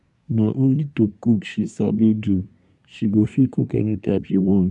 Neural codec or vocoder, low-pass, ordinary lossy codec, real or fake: codec, 24 kHz, 1 kbps, SNAC; 10.8 kHz; none; fake